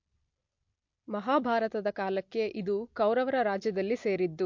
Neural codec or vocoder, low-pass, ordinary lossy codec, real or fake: none; 7.2 kHz; MP3, 48 kbps; real